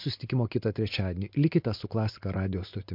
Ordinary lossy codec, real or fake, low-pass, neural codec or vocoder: MP3, 48 kbps; real; 5.4 kHz; none